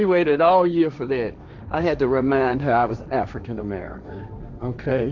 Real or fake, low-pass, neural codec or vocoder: fake; 7.2 kHz; codec, 16 kHz, 1.1 kbps, Voila-Tokenizer